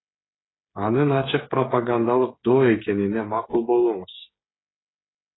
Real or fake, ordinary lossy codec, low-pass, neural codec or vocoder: fake; AAC, 16 kbps; 7.2 kHz; codec, 16 kHz, 8 kbps, FreqCodec, smaller model